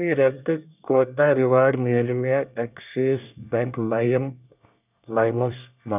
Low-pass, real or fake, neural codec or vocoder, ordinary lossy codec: 3.6 kHz; fake; codec, 24 kHz, 1 kbps, SNAC; none